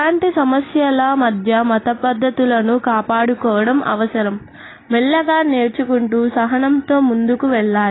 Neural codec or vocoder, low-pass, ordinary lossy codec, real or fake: none; 7.2 kHz; AAC, 16 kbps; real